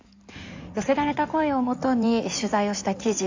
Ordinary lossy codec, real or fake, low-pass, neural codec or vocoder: AAC, 48 kbps; fake; 7.2 kHz; codec, 16 kHz in and 24 kHz out, 2.2 kbps, FireRedTTS-2 codec